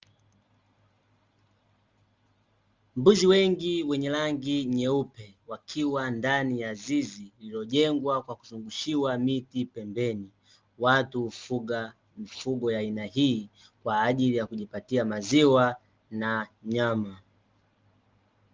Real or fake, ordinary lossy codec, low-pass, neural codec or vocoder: real; Opus, 32 kbps; 7.2 kHz; none